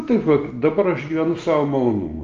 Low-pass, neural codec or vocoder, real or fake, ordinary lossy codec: 7.2 kHz; none; real; Opus, 32 kbps